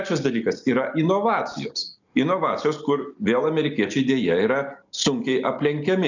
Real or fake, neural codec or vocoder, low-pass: real; none; 7.2 kHz